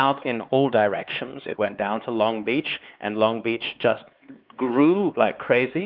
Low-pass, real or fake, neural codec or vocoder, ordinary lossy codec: 5.4 kHz; fake; codec, 16 kHz, 4 kbps, X-Codec, HuBERT features, trained on LibriSpeech; Opus, 32 kbps